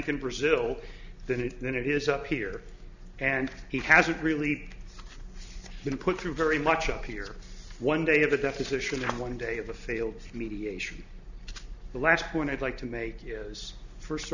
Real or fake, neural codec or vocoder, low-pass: real; none; 7.2 kHz